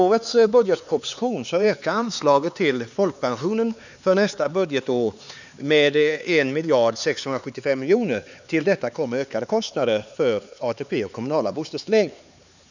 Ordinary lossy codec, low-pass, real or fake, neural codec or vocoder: none; 7.2 kHz; fake; codec, 16 kHz, 4 kbps, X-Codec, HuBERT features, trained on LibriSpeech